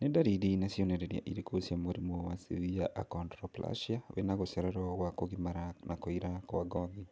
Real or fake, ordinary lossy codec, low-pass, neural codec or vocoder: real; none; none; none